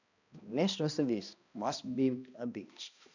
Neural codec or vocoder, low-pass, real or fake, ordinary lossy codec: codec, 16 kHz, 1 kbps, X-Codec, HuBERT features, trained on balanced general audio; 7.2 kHz; fake; none